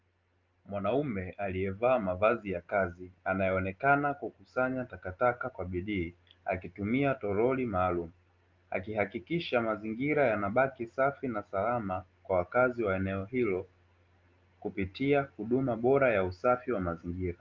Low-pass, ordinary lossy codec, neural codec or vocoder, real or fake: 7.2 kHz; Opus, 24 kbps; none; real